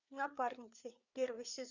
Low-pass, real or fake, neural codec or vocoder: 7.2 kHz; fake; codec, 16 kHz, 4 kbps, FreqCodec, larger model